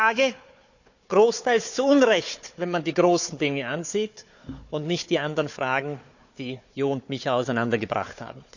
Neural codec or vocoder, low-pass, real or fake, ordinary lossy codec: codec, 16 kHz, 4 kbps, FunCodec, trained on Chinese and English, 50 frames a second; 7.2 kHz; fake; none